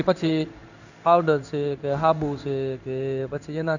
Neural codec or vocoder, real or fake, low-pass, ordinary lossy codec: codec, 16 kHz in and 24 kHz out, 1 kbps, XY-Tokenizer; fake; 7.2 kHz; none